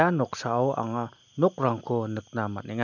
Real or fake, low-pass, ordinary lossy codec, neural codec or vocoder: real; 7.2 kHz; none; none